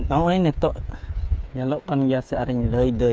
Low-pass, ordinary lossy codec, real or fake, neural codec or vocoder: none; none; fake; codec, 16 kHz, 8 kbps, FreqCodec, smaller model